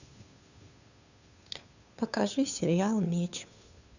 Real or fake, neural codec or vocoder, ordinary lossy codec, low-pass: fake; codec, 16 kHz, 2 kbps, FunCodec, trained on Chinese and English, 25 frames a second; none; 7.2 kHz